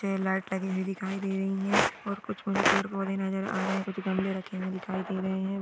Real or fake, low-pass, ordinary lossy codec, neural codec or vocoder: real; none; none; none